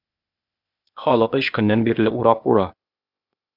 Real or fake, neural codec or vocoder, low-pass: fake; codec, 16 kHz, 0.8 kbps, ZipCodec; 5.4 kHz